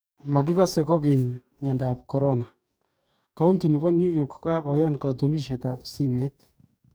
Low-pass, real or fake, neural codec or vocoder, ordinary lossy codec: none; fake; codec, 44.1 kHz, 2.6 kbps, DAC; none